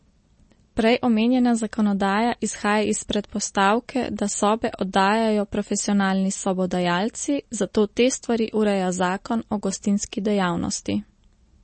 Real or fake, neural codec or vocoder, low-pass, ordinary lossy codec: real; none; 10.8 kHz; MP3, 32 kbps